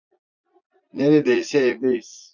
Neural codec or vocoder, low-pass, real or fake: vocoder, 44.1 kHz, 80 mel bands, Vocos; 7.2 kHz; fake